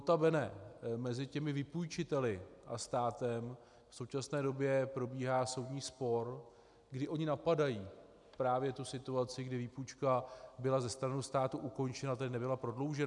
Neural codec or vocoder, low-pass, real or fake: none; 10.8 kHz; real